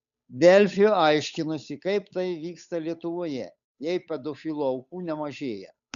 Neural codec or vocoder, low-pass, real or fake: codec, 16 kHz, 8 kbps, FunCodec, trained on Chinese and English, 25 frames a second; 7.2 kHz; fake